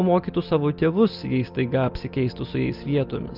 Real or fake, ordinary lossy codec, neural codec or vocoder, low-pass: real; Opus, 24 kbps; none; 5.4 kHz